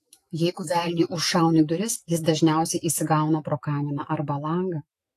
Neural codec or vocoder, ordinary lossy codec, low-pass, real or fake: autoencoder, 48 kHz, 128 numbers a frame, DAC-VAE, trained on Japanese speech; AAC, 48 kbps; 14.4 kHz; fake